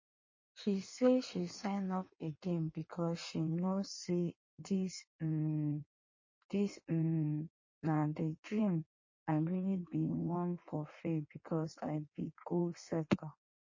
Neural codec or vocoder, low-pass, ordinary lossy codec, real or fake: codec, 16 kHz in and 24 kHz out, 1.1 kbps, FireRedTTS-2 codec; 7.2 kHz; MP3, 32 kbps; fake